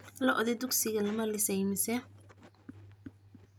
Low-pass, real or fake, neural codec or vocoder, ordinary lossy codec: none; real; none; none